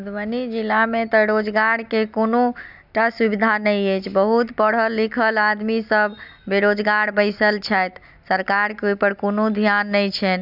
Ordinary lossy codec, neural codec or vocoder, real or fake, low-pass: none; none; real; 5.4 kHz